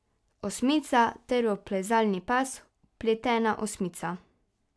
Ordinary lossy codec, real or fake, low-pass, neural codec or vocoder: none; real; none; none